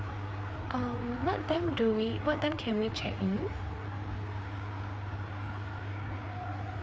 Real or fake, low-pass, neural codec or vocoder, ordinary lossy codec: fake; none; codec, 16 kHz, 4 kbps, FreqCodec, larger model; none